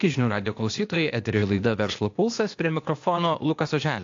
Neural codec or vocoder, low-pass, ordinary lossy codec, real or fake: codec, 16 kHz, 0.8 kbps, ZipCodec; 7.2 kHz; AAC, 48 kbps; fake